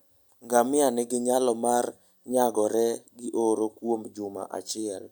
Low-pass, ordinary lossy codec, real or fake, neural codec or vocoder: none; none; real; none